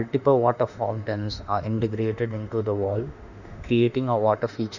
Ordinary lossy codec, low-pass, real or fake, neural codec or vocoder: none; 7.2 kHz; fake; autoencoder, 48 kHz, 32 numbers a frame, DAC-VAE, trained on Japanese speech